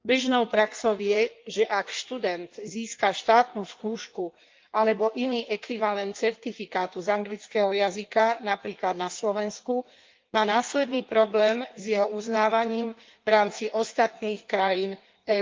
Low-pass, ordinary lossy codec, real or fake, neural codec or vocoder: 7.2 kHz; Opus, 32 kbps; fake; codec, 16 kHz in and 24 kHz out, 1.1 kbps, FireRedTTS-2 codec